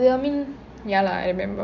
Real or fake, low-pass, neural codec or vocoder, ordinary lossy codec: real; 7.2 kHz; none; none